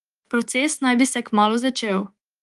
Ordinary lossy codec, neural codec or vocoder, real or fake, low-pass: Opus, 24 kbps; codec, 24 kHz, 3.1 kbps, DualCodec; fake; 10.8 kHz